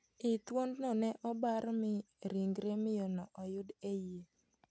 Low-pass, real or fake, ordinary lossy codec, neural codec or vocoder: none; real; none; none